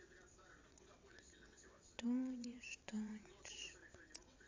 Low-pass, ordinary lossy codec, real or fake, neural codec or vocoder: 7.2 kHz; AAC, 32 kbps; fake; vocoder, 22.05 kHz, 80 mel bands, WaveNeXt